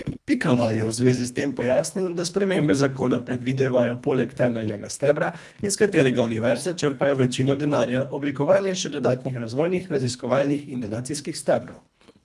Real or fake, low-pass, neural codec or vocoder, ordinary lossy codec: fake; none; codec, 24 kHz, 1.5 kbps, HILCodec; none